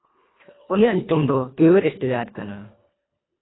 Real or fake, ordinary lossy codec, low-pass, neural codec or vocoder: fake; AAC, 16 kbps; 7.2 kHz; codec, 24 kHz, 1.5 kbps, HILCodec